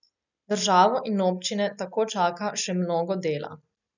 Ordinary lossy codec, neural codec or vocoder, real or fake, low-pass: none; none; real; 7.2 kHz